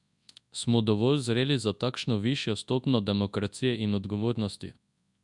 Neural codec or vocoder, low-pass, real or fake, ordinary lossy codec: codec, 24 kHz, 0.9 kbps, WavTokenizer, large speech release; 10.8 kHz; fake; none